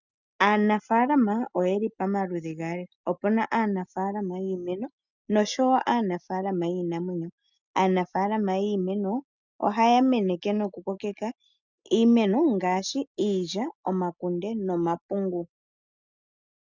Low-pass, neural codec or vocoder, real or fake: 7.2 kHz; none; real